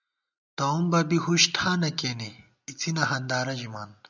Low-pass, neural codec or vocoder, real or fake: 7.2 kHz; none; real